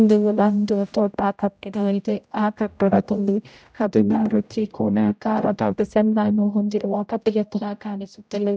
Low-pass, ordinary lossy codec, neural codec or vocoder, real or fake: none; none; codec, 16 kHz, 0.5 kbps, X-Codec, HuBERT features, trained on general audio; fake